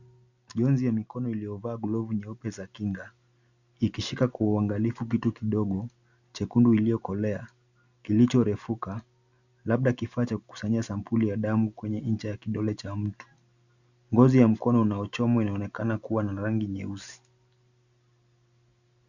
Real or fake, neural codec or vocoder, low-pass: real; none; 7.2 kHz